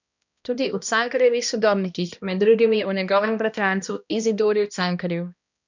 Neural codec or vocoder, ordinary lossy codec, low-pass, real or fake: codec, 16 kHz, 1 kbps, X-Codec, HuBERT features, trained on balanced general audio; none; 7.2 kHz; fake